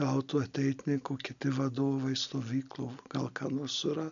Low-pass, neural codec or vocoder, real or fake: 7.2 kHz; none; real